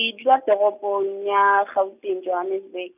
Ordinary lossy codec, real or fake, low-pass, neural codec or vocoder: none; real; 3.6 kHz; none